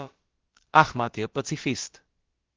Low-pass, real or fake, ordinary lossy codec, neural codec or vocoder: 7.2 kHz; fake; Opus, 16 kbps; codec, 16 kHz, about 1 kbps, DyCAST, with the encoder's durations